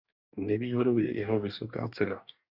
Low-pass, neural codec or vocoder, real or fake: 5.4 kHz; codec, 44.1 kHz, 2.6 kbps, DAC; fake